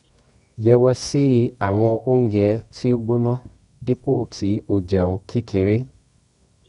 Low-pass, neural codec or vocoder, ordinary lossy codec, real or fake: 10.8 kHz; codec, 24 kHz, 0.9 kbps, WavTokenizer, medium music audio release; none; fake